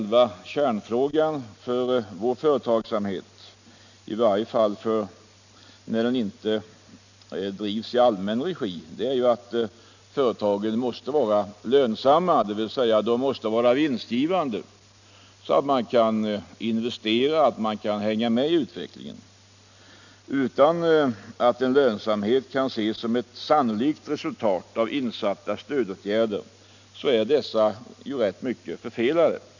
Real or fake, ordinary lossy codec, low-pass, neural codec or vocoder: real; none; 7.2 kHz; none